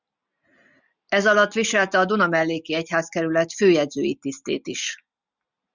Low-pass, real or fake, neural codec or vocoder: 7.2 kHz; real; none